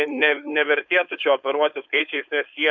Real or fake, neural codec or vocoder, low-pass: fake; codec, 16 kHz, 4.8 kbps, FACodec; 7.2 kHz